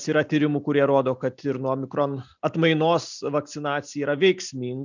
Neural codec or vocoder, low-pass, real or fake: none; 7.2 kHz; real